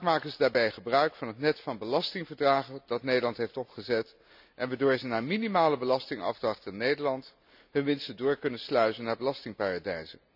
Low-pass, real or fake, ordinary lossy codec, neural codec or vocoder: 5.4 kHz; real; none; none